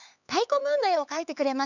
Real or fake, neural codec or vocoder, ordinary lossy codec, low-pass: fake; codec, 16 kHz, 4 kbps, X-Codec, HuBERT features, trained on LibriSpeech; none; 7.2 kHz